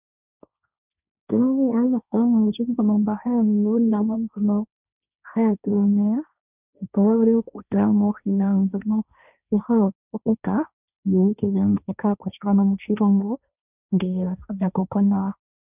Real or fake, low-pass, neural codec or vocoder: fake; 3.6 kHz; codec, 16 kHz, 1.1 kbps, Voila-Tokenizer